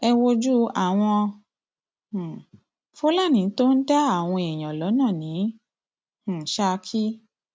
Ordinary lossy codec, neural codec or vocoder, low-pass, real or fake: none; none; none; real